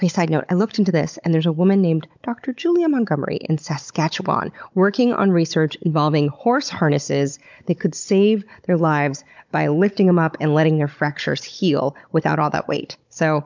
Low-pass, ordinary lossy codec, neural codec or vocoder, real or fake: 7.2 kHz; MP3, 64 kbps; codec, 16 kHz, 16 kbps, FunCodec, trained on Chinese and English, 50 frames a second; fake